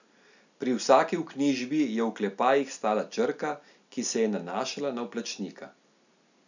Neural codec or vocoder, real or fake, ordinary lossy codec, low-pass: none; real; none; 7.2 kHz